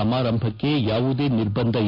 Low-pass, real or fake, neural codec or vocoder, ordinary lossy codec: 5.4 kHz; real; none; AAC, 32 kbps